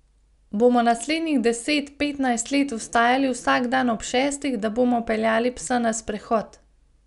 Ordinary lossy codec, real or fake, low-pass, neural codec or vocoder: none; real; 10.8 kHz; none